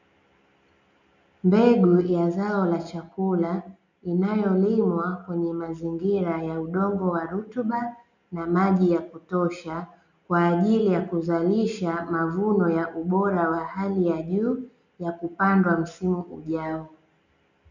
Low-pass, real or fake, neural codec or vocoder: 7.2 kHz; real; none